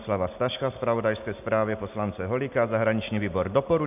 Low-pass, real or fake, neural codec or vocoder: 3.6 kHz; real; none